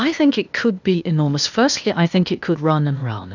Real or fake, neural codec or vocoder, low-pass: fake; codec, 16 kHz, 0.8 kbps, ZipCodec; 7.2 kHz